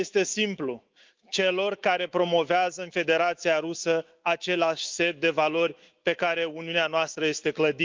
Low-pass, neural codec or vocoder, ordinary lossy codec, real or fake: 7.2 kHz; autoencoder, 48 kHz, 128 numbers a frame, DAC-VAE, trained on Japanese speech; Opus, 32 kbps; fake